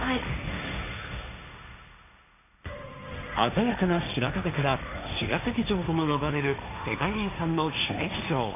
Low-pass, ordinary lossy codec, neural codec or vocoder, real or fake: 3.6 kHz; none; codec, 16 kHz, 1.1 kbps, Voila-Tokenizer; fake